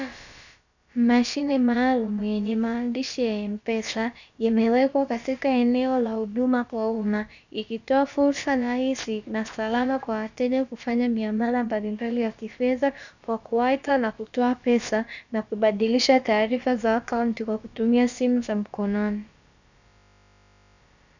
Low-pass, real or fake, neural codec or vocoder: 7.2 kHz; fake; codec, 16 kHz, about 1 kbps, DyCAST, with the encoder's durations